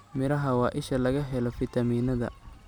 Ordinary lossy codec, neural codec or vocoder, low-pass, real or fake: none; none; none; real